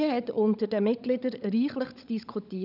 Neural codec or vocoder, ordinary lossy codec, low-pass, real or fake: codec, 16 kHz, 16 kbps, FunCodec, trained on LibriTTS, 50 frames a second; none; 5.4 kHz; fake